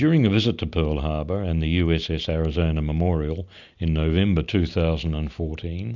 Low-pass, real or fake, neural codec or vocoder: 7.2 kHz; real; none